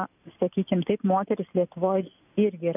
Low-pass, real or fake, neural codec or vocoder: 3.6 kHz; real; none